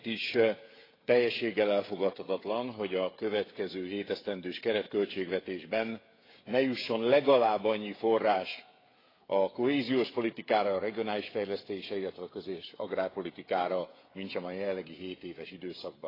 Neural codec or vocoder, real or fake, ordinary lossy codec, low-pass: codec, 16 kHz, 16 kbps, FreqCodec, smaller model; fake; AAC, 24 kbps; 5.4 kHz